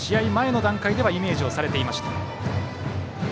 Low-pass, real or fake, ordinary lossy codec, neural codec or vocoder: none; real; none; none